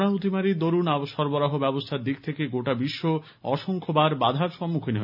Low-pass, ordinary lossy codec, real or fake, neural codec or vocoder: 5.4 kHz; none; real; none